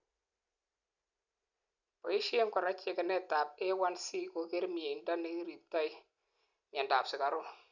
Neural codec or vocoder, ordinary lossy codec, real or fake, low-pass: none; none; real; 7.2 kHz